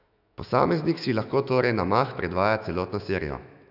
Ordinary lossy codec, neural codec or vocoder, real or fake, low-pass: none; autoencoder, 48 kHz, 128 numbers a frame, DAC-VAE, trained on Japanese speech; fake; 5.4 kHz